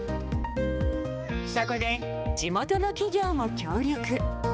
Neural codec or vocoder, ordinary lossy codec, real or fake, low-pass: codec, 16 kHz, 2 kbps, X-Codec, HuBERT features, trained on balanced general audio; none; fake; none